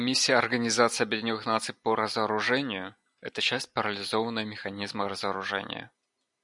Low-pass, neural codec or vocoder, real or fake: 10.8 kHz; none; real